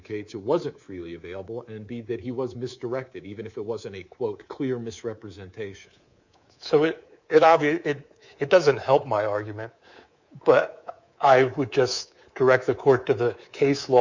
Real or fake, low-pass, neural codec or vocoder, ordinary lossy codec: fake; 7.2 kHz; codec, 24 kHz, 3.1 kbps, DualCodec; Opus, 64 kbps